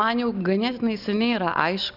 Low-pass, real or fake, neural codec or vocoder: 5.4 kHz; real; none